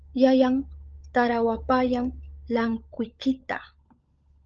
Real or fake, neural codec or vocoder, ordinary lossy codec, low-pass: fake; codec, 16 kHz, 16 kbps, FunCodec, trained on LibriTTS, 50 frames a second; Opus, 24 kbps; 7.2 kHz